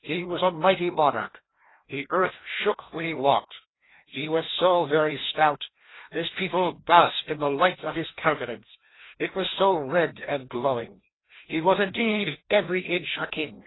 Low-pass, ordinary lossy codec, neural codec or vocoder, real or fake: 7.2 kHz; AAC, 16 kbps; codec, 16 kHz, 1 kbps, FreqCodec, larger model; fake